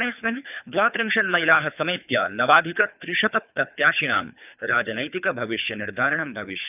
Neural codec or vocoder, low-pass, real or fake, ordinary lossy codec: codec, 24 kHz, 3 kbps, HILCodec; 3.6 kHz; fake; none